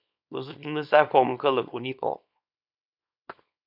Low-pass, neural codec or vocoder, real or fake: 5.4 kHz; codec, 24 kHz, 0.9 kbps, WavTokenizer, small release; fake